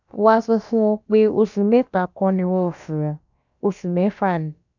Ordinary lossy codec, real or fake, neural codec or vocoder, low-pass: none; fake; codec, 16 kHz, about 1 kbps, DyCAST, with the encoder's durations; 7.2 kHz